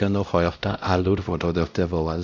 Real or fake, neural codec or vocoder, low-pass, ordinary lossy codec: fake; codec, 16 kHz, 0.5 kbps, X-Codec, WavLM features, trained on Multilingual LibriSpeech; 7.2 kHz; none